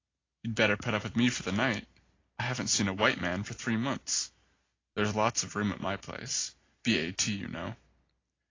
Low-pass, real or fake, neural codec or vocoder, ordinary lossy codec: 7.2 kHz; real; none; AAC, 32 kbps